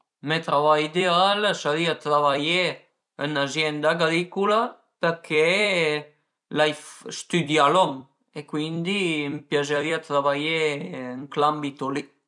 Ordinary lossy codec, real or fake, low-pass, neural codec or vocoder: none; fake; 10.8 kHz; vocoder, 44.1 kHz, 128 mel bands every 256 samples, BigVGAN v2